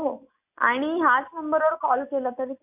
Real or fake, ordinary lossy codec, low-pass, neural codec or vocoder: real; AAC, 32 kbps; 3.6 kHz; none